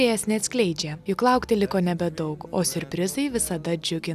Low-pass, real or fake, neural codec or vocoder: 14.4 kHz; real; none